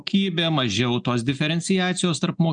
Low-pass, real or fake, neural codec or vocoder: 9.9 kHz; real; none